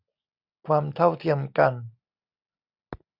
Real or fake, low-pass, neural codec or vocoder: real; 5.4 kHz; none